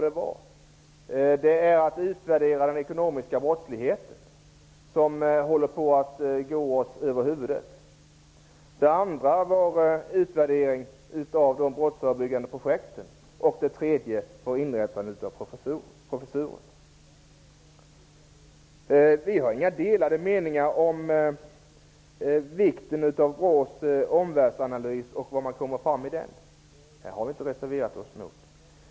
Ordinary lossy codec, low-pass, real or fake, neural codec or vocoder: none; none; real; none